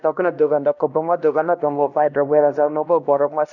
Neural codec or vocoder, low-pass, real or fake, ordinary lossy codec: codec, 16 kHz, 1 kbps, X-Codec, HuBERT features, trained on LibriSpeech; 7.2 kHz; fake; none